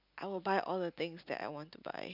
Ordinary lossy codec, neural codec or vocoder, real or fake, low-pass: none; none; real; 5.4 kHz